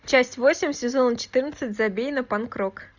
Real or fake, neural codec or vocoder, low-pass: real; none; 7.2 kHz